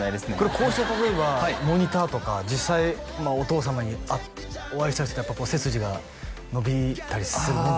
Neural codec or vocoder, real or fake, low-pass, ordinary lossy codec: none; real; none; none